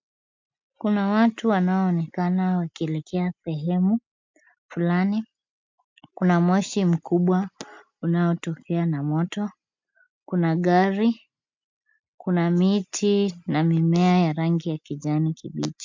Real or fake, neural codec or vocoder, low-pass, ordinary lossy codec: real; none; 7.2 kHz; MP3, 64 kbps